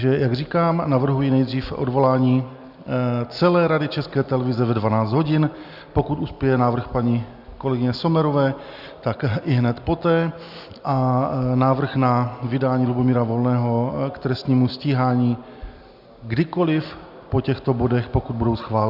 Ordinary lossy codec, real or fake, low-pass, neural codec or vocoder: Opus, 64 kbps; real; 5.4 kHz; none